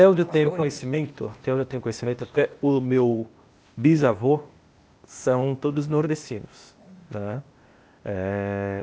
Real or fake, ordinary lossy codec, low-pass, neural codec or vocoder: fake; none; none; codec, 16 kHz, 0.8 kbps, ZipCodec